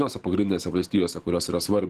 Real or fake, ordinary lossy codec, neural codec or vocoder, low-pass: fake; Opus, 16 kbps; autoencoder, 48 kHz, 128 numbers a frame, DAC-VAE, trained on Japanese speech; 14.4 kHz